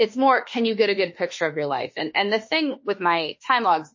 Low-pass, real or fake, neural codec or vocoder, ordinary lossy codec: 7.2 kHz; fake; autoencoder, 48 kHz, 32 numbers a frame, DAC-VAE, trained on Japanese speech; MP3, 32 kbps